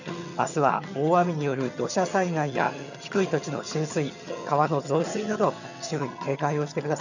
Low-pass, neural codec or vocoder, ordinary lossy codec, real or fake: 7.2 kHz; vocoder, 22.05 kHz, 80 mel bands, HiFi-GAN; none; fake